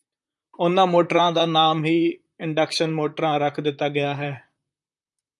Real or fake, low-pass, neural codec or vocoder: fake; 10.8 kHz; vocoder, 44.1 kHz, 128 mel bands, Pupu-Vocoder